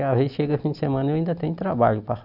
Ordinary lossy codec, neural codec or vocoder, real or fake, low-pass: none; none; real; 5.4 kHz